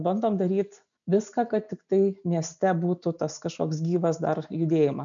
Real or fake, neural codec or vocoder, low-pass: real; none; 7.2 kHz